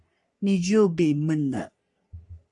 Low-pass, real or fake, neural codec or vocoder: 10.8 kHz; fake; codec, 44.1 kHz, 3.4 kbps, Pupu-Codec